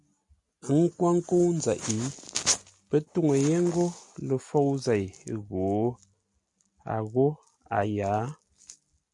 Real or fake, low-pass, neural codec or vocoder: real; 10.8 kHz; none